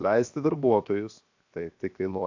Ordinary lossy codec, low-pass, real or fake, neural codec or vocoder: AAC, 48 kbps; 7.2 kHz; fake; codec, 16 kHz, 0.7 kbps, FocalCodec